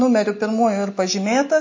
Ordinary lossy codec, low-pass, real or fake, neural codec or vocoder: MP3, 32 kbps; 7.2 kHz; real; none